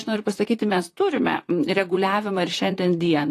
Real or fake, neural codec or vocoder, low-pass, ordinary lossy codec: fake; vocoder, 44.1 kHz, 128 mel bands, Pupu-Vocoder; 14.4 kHz; AAC, 48 kbps